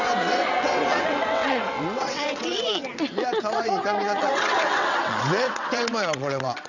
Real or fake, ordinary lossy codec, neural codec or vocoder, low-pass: fake; none; vocoder, 22.05 kHz, 80 mel bands, WaveNeXt; 7.2 kHz